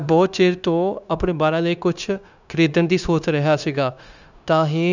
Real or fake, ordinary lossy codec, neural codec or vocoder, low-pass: fake; none; codec, 16 kHz, 0.9 kbps, LongCat-Audio-Codec; 7.2 kHz